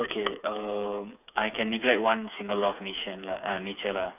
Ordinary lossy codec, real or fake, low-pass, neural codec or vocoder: none; fake; 3.6 kHz; codec, 16 kHz, 4 kbps, FreqCodec, smaller model